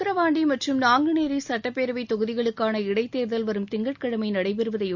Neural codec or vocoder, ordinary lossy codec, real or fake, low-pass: none; Opus, 64 kbps; real; 7.2 kHz